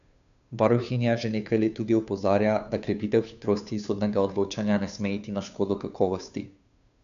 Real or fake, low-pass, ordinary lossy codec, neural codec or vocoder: fake; 7.2 kHz; none; codec, 16 kHz, 2 kbps, FunCodec, trained on Chinese and English, 25 frames a second